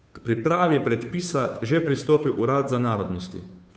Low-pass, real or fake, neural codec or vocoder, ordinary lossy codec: none; fake; codec, 16 kHz, 2 kbps, FunCodec, trained on Chinese and English, 25 frames a second; none